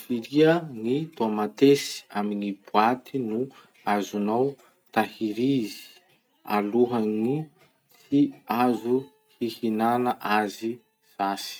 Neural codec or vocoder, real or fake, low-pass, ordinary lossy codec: none; real; none; none